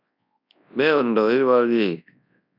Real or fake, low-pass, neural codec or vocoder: fake; 5.4 kHz; codec, 24 kHz, 0.9 kbps, WavTokenizer, large speech release